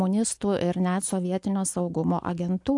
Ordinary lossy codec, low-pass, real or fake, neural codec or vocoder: AAC, 64 kbps; 10.8 kHz; real; none